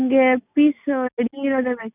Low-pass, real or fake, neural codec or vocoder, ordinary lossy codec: 3.6 kHz; real; none; none